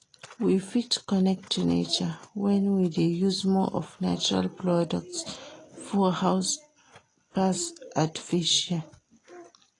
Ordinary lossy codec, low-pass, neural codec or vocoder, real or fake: AAC, 32 kbps; 10.8 kHz; none; real